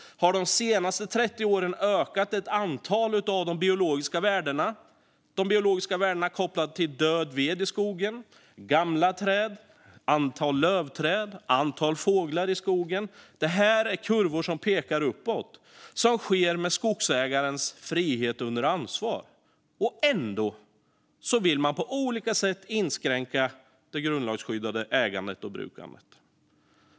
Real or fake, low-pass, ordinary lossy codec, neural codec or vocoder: real; none; none; none